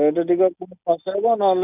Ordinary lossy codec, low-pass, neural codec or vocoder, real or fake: none; 3.6 kHz; none; real